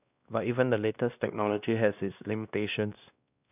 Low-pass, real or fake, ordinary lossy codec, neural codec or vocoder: 3.6 kHz; fake; none; codec, 16 kHz, 1 kbps, X-Codec, WavLM features, trained on Multilingual LibriSpeech